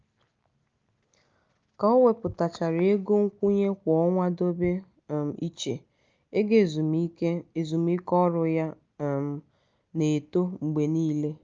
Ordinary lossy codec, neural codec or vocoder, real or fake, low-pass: Opus, 24 kbps; none; real; 7.2 kHz